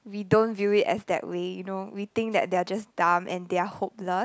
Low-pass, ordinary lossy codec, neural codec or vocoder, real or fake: none; none; none; real